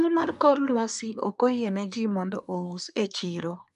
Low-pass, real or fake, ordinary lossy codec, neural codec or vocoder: 10.8 kHz; fake; none; codec, 24 kHz, 1 kbps, SNAC